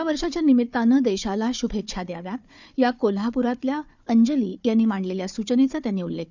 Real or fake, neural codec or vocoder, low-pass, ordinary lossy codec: fake; codec, 16 kHz, 4 kbps, FunCodec, trained on Chinese and English, 50 frames a second; 7.2 kHz; none